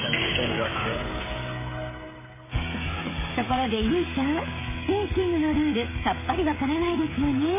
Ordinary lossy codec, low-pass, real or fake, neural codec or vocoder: MP3, 16 kbps; 3.6 kHz; fake; codec, 16 kHz, 16 kbps, FreqCodec, smaller model